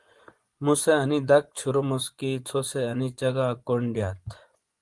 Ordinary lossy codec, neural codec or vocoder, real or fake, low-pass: Opus, 24 kbps; vocoder, 24 kHz, 100 mel bands, Vocos; fake; 10.8 kHz